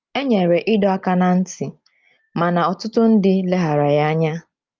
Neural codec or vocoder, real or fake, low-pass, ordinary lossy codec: none; real; 7.2 kHz; Opus, 24 kbps